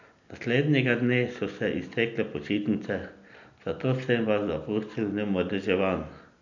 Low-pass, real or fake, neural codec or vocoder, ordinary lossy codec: 7.2 kHz; real; none; none